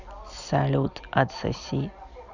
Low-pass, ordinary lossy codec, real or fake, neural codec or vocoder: 7.2 kHz; none; real; none